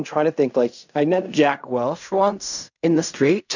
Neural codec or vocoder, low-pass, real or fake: codec, 16 kHz in and 24 kHz out, 0.4 kbps, LongCat-Audio-Codec, fine tuned four codebook decoder; 7.2 kHz; fake